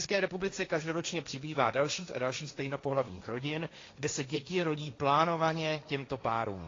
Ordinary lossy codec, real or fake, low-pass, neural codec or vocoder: AAC, 32 kbps; fake; 7.2 kHz; codec, 16 kHz, 1.1 kbps, Voila-Tokenizer